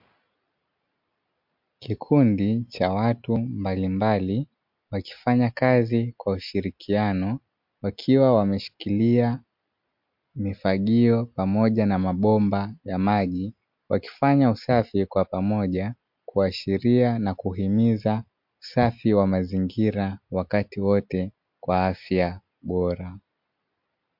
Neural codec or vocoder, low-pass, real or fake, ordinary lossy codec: none; 5.4 kHz; real; MP3, 48 kbps